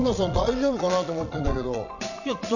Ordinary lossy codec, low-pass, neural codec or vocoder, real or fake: none; 7.2 kHz; vocoder, 22.05 kHz, 80 mel bands, Vocos; fake